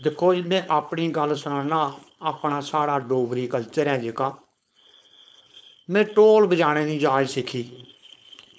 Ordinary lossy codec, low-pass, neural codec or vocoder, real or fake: none; none; codec, 16 kHz, 4.8 kbps, FACodec; fake